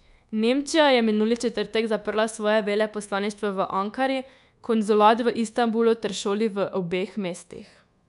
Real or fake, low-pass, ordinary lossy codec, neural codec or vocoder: fake; 10.8 kHz; none; codec, 24 kHz, 1.2 kbps, DualCodec